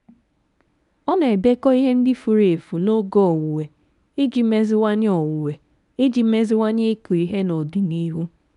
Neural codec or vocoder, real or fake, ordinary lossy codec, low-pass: codec, 24 kHz, 0.9 kbps, WavTokenizer, medium speech release version 1; fake; none; 10.8 kHz